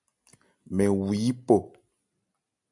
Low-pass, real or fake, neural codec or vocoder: 10.8 kHz; real; none